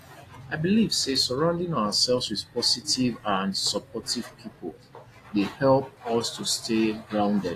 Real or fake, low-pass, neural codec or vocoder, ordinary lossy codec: real; 14.4 kHz; none; AAC, 48 kbps